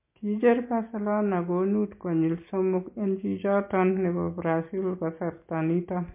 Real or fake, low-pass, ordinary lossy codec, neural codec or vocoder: real; 3.6 kHz; MP3, 24 kbps; none